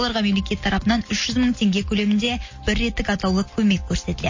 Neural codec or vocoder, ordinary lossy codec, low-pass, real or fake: none; MP3, 32 kbps; 7.2 kHz; real